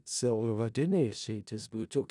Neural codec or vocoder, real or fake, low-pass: codec, 16 kHz in and 24 kHz out, 0.4 kbps, LongCat-Audio-Codec, four codebook decoder; fake; 10.8 kHz